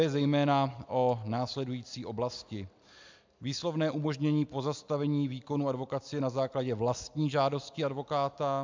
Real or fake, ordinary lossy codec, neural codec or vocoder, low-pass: real; MP3, 64 kbps; none; 7.2 kHz